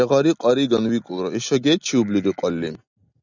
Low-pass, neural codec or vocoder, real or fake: 7.2 kHz; none; real